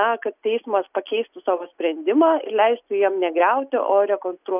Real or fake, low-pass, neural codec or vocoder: real; 3.6 kHz; none